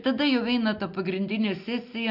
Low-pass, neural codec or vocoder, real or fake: 5.4 kHz; none; real